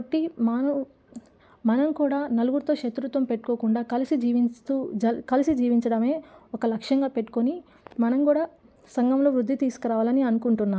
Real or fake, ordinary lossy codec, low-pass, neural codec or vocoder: real; none; none; none